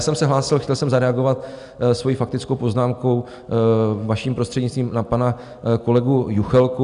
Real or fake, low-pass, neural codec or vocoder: real; 10.8 kHz; none